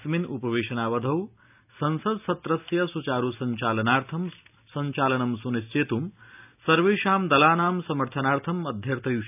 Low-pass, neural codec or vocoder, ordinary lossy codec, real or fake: 3.6 kHz; none; none; real